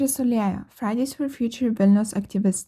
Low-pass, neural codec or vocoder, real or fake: 14.4 kHz; none; real